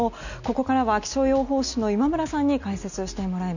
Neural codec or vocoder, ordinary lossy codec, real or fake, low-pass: none; none; real; 7.2 kHz